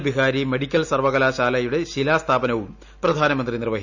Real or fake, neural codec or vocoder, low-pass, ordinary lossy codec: real; none; 7.2 kHz; none